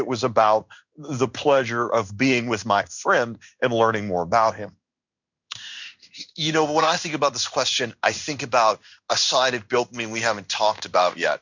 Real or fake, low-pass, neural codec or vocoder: fake; 7.2 kHz; codec, 16 kHz in and 24 kHz out, 1 kbps, XY-Tokenizer